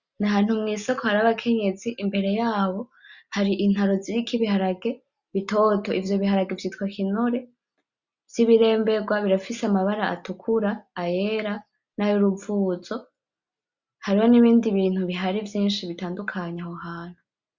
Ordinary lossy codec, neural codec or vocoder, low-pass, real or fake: Opus, 64 kbps; none; 7.2 kHz; real